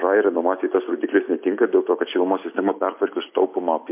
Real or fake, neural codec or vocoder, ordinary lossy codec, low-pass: real; none; MP3, 32 kbps; 3.6 kHz